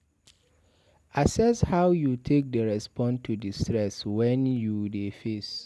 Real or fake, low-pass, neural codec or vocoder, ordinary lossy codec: real; none; none; none